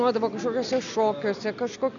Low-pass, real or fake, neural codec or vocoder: 7.2 kHz; real; none